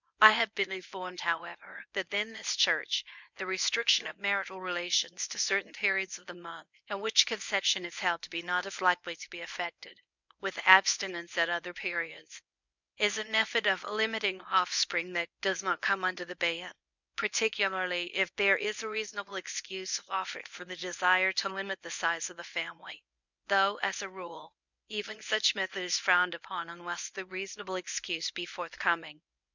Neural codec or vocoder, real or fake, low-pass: codec, 24 kHz, 0.9 kbps, WavTokenizer, medium speech release version 1; fake; 7.2 kHz